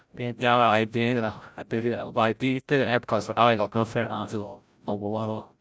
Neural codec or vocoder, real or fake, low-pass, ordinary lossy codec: codec, 16 kHz, 0.5 kbps, FreqCodec, larger model; fake; none; none